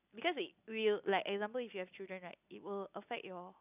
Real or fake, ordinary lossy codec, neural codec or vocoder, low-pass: real; none; none; 3.6 kHz